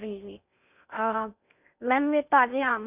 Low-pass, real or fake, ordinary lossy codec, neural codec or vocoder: 3.6 kHz; fake; none; codec, 16 kHz in and 24 kHz out, 0.6 kbps, FocalCodec, streaming, 2048 codes